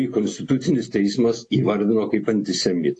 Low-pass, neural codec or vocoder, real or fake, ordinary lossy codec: 9.9 kHz; none; real; AAC, 48 kbps